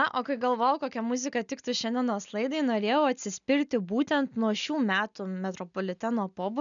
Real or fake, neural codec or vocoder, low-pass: real; none; 7.2 kHz